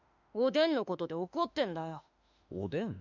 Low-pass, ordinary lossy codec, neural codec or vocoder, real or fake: 7.2 kHz; none; autoencoder, 48 kHz, 32 numbers a frame, DAC-VAE, trained on Japanese speech; fake